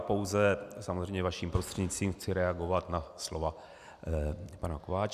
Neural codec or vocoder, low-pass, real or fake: none; 14.4 kHz; real